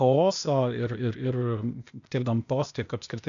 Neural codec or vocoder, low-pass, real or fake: codec, 16 kHz, 0.8 kbps, ZipCodec; 7.2 kHz; fake